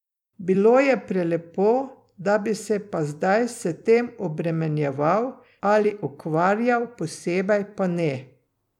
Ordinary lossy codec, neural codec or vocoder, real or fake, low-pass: none; vocoder, 44.1 kHz, 128 mel bands every 256 samples, BigVGAN v2; fake; 19.8 kHz